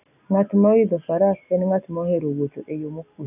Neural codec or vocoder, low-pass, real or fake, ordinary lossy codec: none; 3.6 kHz; real; none